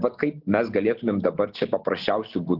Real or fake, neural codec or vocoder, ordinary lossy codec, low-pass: real; none; Opus, 16 kbps; 5.4 kHz